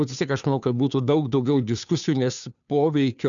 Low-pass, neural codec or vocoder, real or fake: 7.2 kHz; codec, 16 kHz, 2 kbps, FunCodec, trained on Chinese and English, 25 frames a second; fake